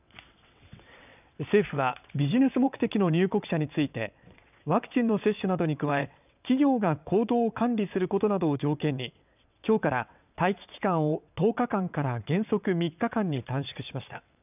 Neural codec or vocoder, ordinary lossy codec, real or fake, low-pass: vocoder, 22.05 kHz, 80 mel bands, WaveNeXt; none; fake; 3.6 kHz